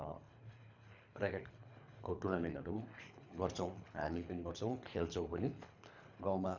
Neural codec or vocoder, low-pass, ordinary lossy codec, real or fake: codec, 24 kHz, 3 kbps, HILCodec; 7.2 kHz; none; fake